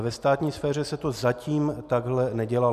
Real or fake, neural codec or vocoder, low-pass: real; none; 14.4 kHz